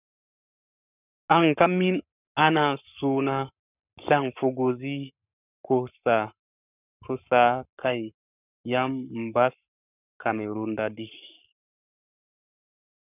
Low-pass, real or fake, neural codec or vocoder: 3.6 kHz; fake; codec, 44.1 kHz, 7.8 kbps, Pupu-Codec